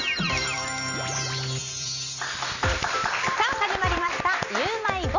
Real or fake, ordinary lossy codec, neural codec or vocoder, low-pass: fake; none; vocoder, 44.1 kHz, 128 mel bands every 256 samples, BigVGAN v2; 7.2 kHz